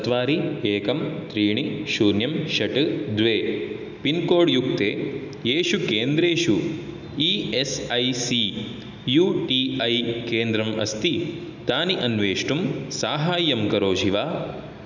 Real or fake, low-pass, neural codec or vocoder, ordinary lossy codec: real; 7.2 kHz; none; none